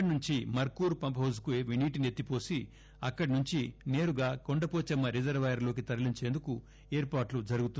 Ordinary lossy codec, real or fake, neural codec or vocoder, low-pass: none; real; none; none